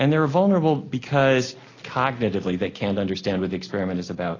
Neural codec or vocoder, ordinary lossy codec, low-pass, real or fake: none; AAC, 32 kbps; 7.2 kHz; real